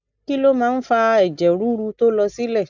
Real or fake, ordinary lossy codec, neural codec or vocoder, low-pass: real; none; none; 7.2 kHz